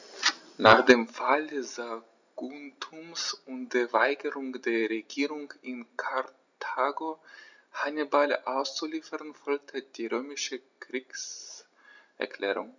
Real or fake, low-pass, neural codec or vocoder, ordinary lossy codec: real; 7.2 kHz; none; none